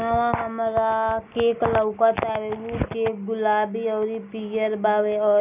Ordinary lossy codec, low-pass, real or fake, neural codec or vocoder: none; 3.6 kHz; real; none